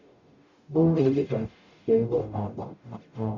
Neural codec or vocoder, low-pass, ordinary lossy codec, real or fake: codec, 44.1 kHz, 0.9 kbps, DAC; 7.2 kHz; none; fake